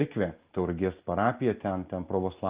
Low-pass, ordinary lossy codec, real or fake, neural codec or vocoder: 3.6 kHz; Opus, 32 kbps; real; none